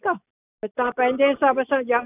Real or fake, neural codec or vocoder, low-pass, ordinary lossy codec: real; none; 3.6 kHz; none